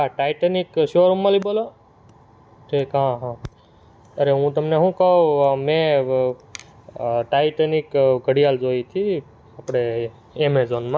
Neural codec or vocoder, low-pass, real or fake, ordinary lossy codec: none; none; real; none